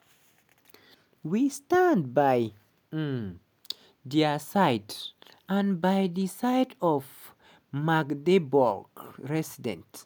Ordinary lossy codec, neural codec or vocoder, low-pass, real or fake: none; none; none; real